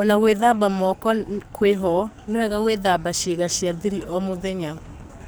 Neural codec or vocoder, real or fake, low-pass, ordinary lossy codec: codec, 44.1 kHz, 2.6 kbps, SNAC; fake; none; none